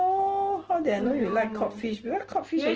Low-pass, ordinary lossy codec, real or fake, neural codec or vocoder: 7.2 kHz; Opus, 16 kbps; real; none